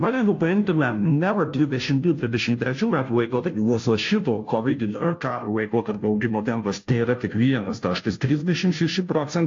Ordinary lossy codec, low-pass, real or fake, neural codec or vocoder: AAC, 48 kbps; 7.2 kHz; fake; codec, 16 kHz, 0.5 kbps, FunCodec, trained on Chinese and English, 25 frames a second